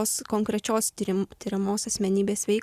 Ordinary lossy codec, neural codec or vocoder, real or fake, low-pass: Opus, 64 kbps; none; real; 14.4 kHz